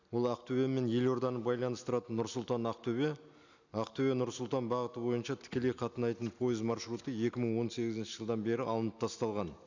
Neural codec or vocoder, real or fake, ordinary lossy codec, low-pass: none; real; none; 7.2 kHz